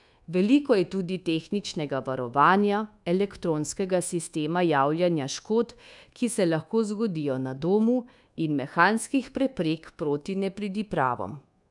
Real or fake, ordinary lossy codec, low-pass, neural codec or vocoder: fake; none; 10.8 kHz; codec, 24 kHz, 1.2 kbps, DualCodec